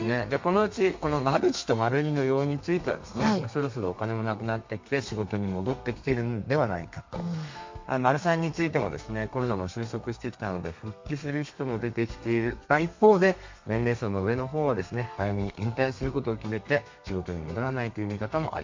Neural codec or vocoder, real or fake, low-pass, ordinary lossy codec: codec, 32 kHz, 1.9 kbps, SNAC; fake; 7.2 kHz; MP3, 48 kbps